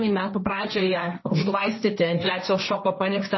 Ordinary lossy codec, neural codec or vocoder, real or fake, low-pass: MP3, 24 kbps; codec, 16 kHz, 1.1 kbps, Voila-Tokenizer; fake; 7.2 kHz